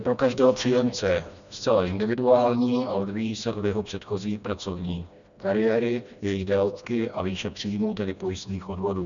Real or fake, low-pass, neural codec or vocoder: fake; 7.2 kHz; codec, 16 kHz, 1 kbps, FreqCodec, smaller model